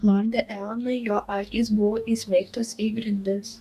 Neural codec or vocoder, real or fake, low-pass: codec, 44.1 kHz, 2.6 kbps, DAC; fake; 14.4 kHz